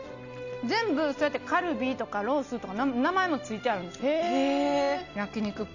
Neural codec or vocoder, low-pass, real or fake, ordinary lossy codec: none; 7.2 kHz; real; none